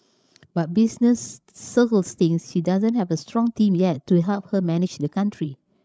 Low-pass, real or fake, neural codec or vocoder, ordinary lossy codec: none; fake; codec, 16 kHz, 16 kbps, FreqCodec, larger model; none